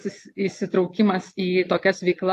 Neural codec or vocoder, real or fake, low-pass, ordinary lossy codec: none; real; 14.4 kHz; AAC, 64 kbps